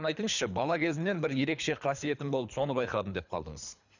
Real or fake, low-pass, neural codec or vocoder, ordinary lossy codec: fake; 7.2 kHz; codec, 24 kHz, 3 kbps, HILCodec; none